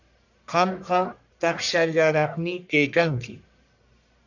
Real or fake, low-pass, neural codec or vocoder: fake; 7.2 kHz; codec, 44.1 kHz, 1.7 kbps, Pupu-Codec